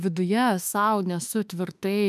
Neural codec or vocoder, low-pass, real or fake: autoencoder, 48 kHz, 32 numbers a frame, DAC-VAE, trained on Japanese speech; 14.4 kHz; fake